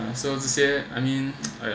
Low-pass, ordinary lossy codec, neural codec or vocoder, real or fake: none; none; none; real